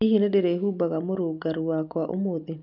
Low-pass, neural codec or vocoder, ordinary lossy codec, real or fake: 5.4 kHz; none; none; real